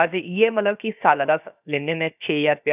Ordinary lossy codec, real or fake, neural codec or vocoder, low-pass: none; fake; codec, 16 kHz, about 1 kbps, DyCAST, with the encoder's durations; 3.6 kHz